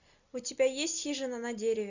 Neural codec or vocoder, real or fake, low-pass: none; real; 7.2 kHz